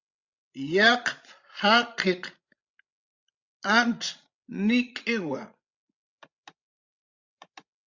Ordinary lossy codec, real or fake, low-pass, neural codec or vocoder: Opus, 64 kbps; fake; 7.2 kHz; codec, 16 kHz, 16 kbps, FreqCodec, larger model